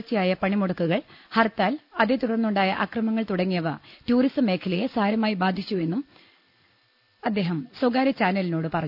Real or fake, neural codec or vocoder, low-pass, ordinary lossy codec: real; none; 5.4 kHz; none